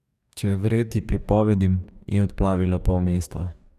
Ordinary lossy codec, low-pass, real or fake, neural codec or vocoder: none; 14.4 kHz; fake; codec, 44.1 kHz, 2.6 kbps, DAC